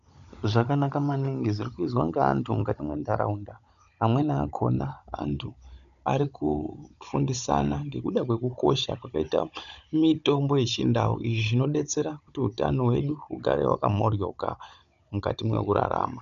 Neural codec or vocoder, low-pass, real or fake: codec, 16 kHz, 16 kbps, FunCodec, trained on Chinese and English, 50 frames a second; 7.2 kHz; fake